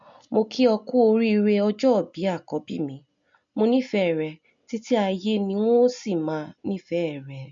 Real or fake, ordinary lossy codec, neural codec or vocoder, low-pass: real; MP3, 48 kbps; none; 7.2 kHz